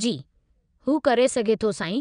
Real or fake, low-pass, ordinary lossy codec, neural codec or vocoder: fake; 9.9 kHz; none; vocoder, 22.05 kHz, 80 mel bands, WaveNeXt